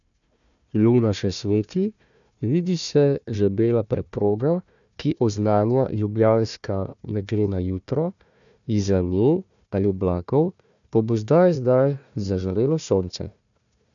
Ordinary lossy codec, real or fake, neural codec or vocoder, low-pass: none; fake; codec, 16 kHz, 1 kbps, FunCodec, trained on Chinese and English, 50 frames a second; 7.2 kHz